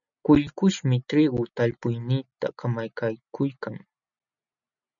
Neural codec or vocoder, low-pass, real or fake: none; 7.2 kHz; real